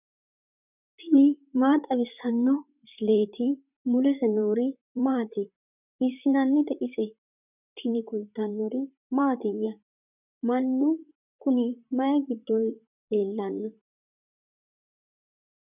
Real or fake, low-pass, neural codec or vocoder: fake; 3.6 kHz; vocoder, 44.1 kHz, 128 mel bands, Pupu-Vocoder